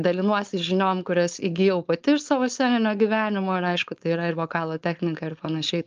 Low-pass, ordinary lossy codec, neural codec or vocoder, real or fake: 7.2 kHz; Opus, 24 kbps; codec, 16 kHz, 4.8 kbps, FACodec; fake